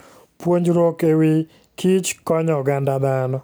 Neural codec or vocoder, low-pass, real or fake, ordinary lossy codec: none; none; real; none